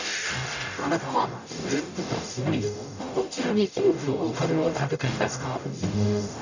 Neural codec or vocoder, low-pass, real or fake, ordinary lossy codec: codec, 44.1 kHz, 0.9 kbps, DAC; 7.2 kHz; fake; none